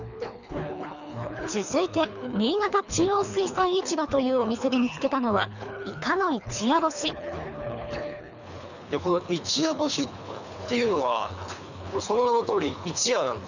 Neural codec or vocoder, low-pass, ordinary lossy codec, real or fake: codec, 24 kHz, 3 kbps, HILCodec; 7.2 kHz; none; fake